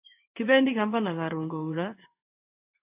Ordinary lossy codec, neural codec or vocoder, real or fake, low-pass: AAC, 32 kbps; codec, 16 kHz in and 24 kHz out, 1 kbps, XY-Tokenizer; fake; 3.6 kHz